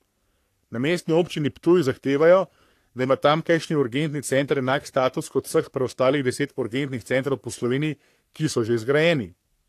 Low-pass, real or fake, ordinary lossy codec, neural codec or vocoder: 14.4 kHz; fake; AAC, 64 kbps; codec, 44.1 kHz, 3.4 kbps, Pupu-Codec